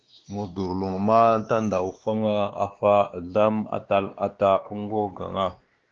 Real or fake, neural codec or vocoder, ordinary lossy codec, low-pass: fake; codec, 16 kHz, 2 kbps, X-Codec, WavLM features, trained on Multilingual LibriSpeech; Opus, 16 kbps; 7.2 kHz